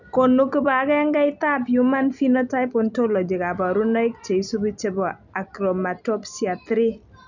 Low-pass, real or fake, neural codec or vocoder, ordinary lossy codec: 7.2 kHz; real; none; none